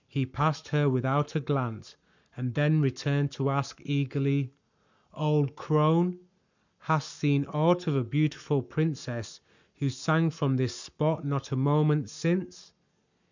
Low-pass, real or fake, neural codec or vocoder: 7.2 kHz; fake; codec, 44.1 kHz, 7.8 kbps, Pupu-Codec